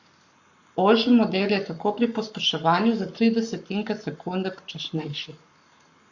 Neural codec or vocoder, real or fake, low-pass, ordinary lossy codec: codec, 44.1 kHz, 7.8 kbps, Pupu-Codec; fake; 7.2 kHz; Opus, 64 kbps